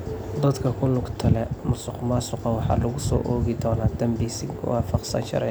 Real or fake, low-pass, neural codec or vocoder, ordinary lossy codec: real; none; none; none